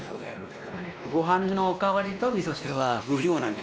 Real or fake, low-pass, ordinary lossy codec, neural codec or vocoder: fake; none; none; codec, 16 kHz, 1 kbps, X-Codec, WavLM features, trained on Multilingual LibriSpeech